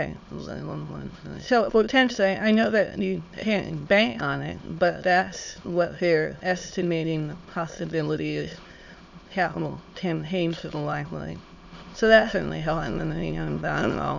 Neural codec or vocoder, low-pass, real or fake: autoencoder, 22.05 kHz, a latent of 192 numbers a frame, VITS, trained on many speakers; 7.2 kHz; fake